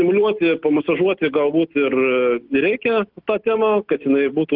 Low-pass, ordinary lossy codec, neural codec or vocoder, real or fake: 5.4 kHz; Opus, 32 kbps; none; real